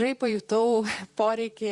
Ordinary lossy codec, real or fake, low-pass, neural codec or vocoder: Opus, 64 kbps; fake; 10.8 kHz; vocoder, 44.1 kHz, 128 mel bands, Pupu-Vocoder